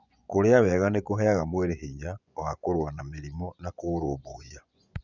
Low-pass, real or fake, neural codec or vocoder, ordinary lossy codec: 7.2 kHz; fake; vocoder, 24 kHz, 100 mel bands, Vocos; none